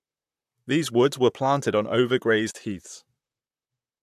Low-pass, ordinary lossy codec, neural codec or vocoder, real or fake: 14.4 kHz; AAC, 96 kbps; vocoder, 44.1 kHz, 128 mel bands, Pupu-Vocoder; fake